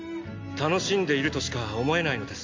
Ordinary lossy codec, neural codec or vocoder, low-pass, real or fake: MP3, 48 kbps; none; 7.2 kHz; real